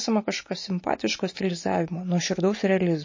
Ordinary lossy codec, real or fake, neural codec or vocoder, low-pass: MP3, 32 kbps; real; none; 7.2 kHz